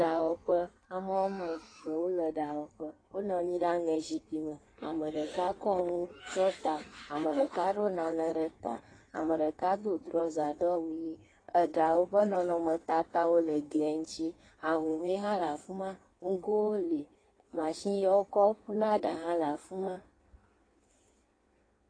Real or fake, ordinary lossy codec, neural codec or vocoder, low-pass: fake; AAC, 32 kbps; codec, 16 kHz in and 24 kHz out, 1.1 kbps, FireRedTTS-2 codec; 9.9 kHz